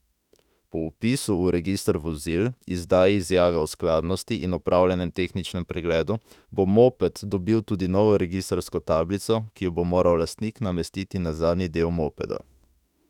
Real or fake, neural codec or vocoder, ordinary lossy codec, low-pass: fake; autoencoder, 48 kHz, 32 numbers a frame, DAC-VAE, trained on Japanese speech; none; 19.8 kHz